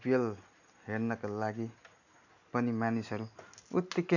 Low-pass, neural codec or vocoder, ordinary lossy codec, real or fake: 7.2 kHz; none; none; real